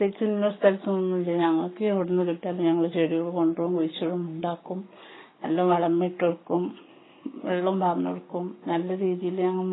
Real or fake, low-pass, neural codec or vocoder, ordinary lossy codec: fake; 7.2 kHz; codec, 44.1 kHz, 7.8 kbps, Pupu-Codec; AAC, 16 kbps